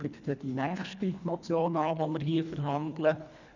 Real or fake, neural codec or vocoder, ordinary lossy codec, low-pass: fake; codec, 24 kHz, 1.5 kbps, HILCodec; none; 7.2 kHz